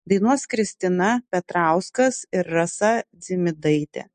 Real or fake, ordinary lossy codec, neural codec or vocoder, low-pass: real; MP3, 48 kbps; none; 9.9 kHz